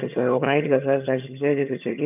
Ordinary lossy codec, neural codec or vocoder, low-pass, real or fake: none; vocoder, 22.05 kHz, 80 mel bands, HiFi-GAN; 3.6 kHz; fake